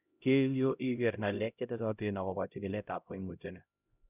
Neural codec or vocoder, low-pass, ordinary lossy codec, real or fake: codec, 16 kHz, 0.5 kbps, X-Codec, HuBERT features, trained on LibriSpeech; 3.6 kHz; none; fake